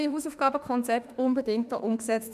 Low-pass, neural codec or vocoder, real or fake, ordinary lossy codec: 14.4 kHz; autoencoder, 48 kHz, 32 numbers a frame, DAC-VAE, trained on Japanese speech; fake; none